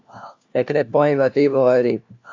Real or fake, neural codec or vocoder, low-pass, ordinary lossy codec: fake; codec, 16 kHz, 1 kbps, FunCodec, trained on LibriTTS, 50 frames a second; 7.2 kHz; AAC, 48 kbps